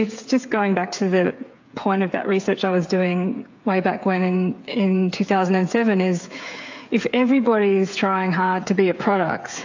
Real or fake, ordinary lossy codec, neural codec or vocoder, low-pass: fake; AAC, 48 kbps; codec, 16 kHz, 8 kbps, FreqCodec, smaller model; 7.2 kHz